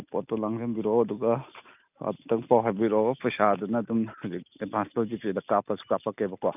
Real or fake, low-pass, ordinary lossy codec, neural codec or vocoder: real; 3.6 kHz; none; none